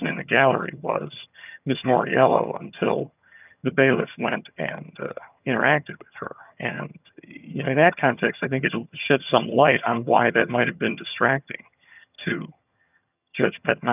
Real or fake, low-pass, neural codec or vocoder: fake; 3.6 kHz; vocoder, 22.05 kHz, 80 mel bands, HiFi-GAN